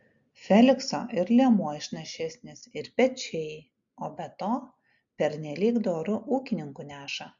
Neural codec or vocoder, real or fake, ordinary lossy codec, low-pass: none; real; MP3, 64 kbps; 7.2 kHz